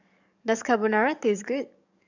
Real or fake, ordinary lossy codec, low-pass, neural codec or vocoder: fake; none; 7.2 kHz; codec, 44.1 kHz, 7.8 kbps, DAC